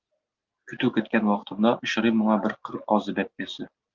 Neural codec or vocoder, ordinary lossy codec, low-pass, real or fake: none; Opus, 24 kbps; 7.2 kHz; real